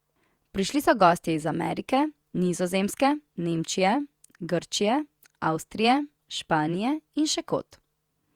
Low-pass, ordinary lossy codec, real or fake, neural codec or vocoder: 19.8 kHz; Opus, 64 kbps; fake; vocoder, 48 kHz, 128 mel bands, Vocos